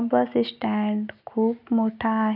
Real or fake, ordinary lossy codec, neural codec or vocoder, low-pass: real; none; none; 5.4 kHz